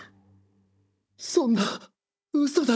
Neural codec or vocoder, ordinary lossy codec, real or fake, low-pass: codec, 16 kHz, 16 kbps, FunCodec, trained on Chinese and English, 50 frames a second; none; fake; none